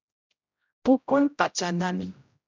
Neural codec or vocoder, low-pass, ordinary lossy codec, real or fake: codec, 16 kHz, 0.5 kbps, X-Codec, HuBERT features, trained on general audio; 7.2 kHz; MP3, 64 kbps; fake